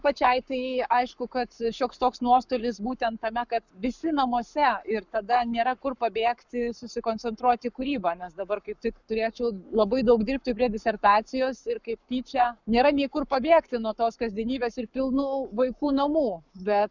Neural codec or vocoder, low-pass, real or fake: codec, 44.1 kHz, 7.8 kbps, Pupu-Codec; 7.2 kHz; fake